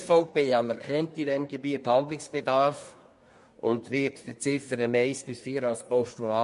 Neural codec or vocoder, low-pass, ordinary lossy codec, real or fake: codec, 24 kHz, 1 kbps, SNAC; 10.8 kHz; MP3, 48 kbps; fake